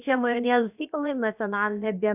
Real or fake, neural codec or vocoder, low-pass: fake; codec, 16 kHz, about 1 kbps, DyCAST, with the encoder's durations; 3.6 kHz